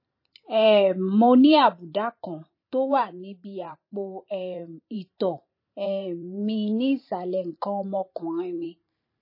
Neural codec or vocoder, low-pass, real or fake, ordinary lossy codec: vocoder, 44.1 kHz, 128 mel bands every 512 samples, BigVGAN v2; 5.4 kHz; fake; MP3, 24 kbps